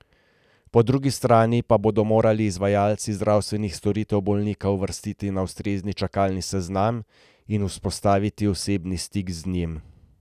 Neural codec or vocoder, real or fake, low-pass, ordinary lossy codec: none; real; 14.4 kHz; none